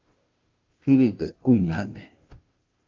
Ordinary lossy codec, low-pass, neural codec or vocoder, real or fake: Opus, 24 kbps; 7.2 kHz; codec, 16 kHz, 0.5 kbps, FunCodec, trained on Chinese and English, 25 frames a second; fake